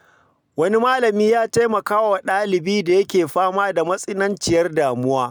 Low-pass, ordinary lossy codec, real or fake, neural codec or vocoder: none; none; real; none